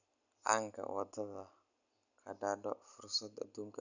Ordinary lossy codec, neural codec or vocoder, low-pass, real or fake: none; none; 7.2 kHz; real